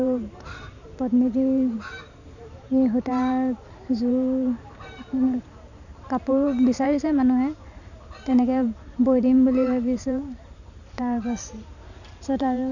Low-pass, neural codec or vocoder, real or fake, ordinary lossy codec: 7.2 kHz; vocoder, 44.1 kHz, 128 mel bands every 512 samples, BigVGAN v2; fake; none